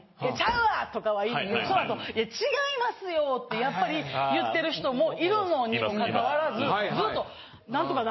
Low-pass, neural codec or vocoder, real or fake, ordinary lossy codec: 7.2 kHz; none; real; MP3, 24 kbps